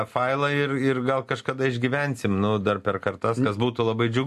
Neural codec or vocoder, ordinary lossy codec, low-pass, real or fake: none; MP3, 64 kbps; 14.4 kHz; real